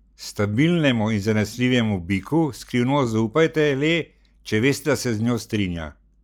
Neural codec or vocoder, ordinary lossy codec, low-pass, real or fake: none; none; 19.8 kHz; real